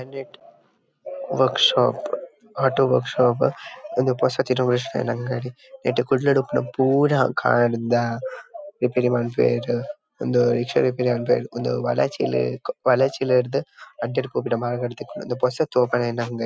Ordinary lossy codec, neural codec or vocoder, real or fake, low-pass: none; none; real; none